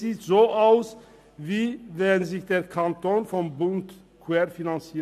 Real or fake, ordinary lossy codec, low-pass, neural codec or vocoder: real; MP3, 64 kbps; 14.4 kHz; none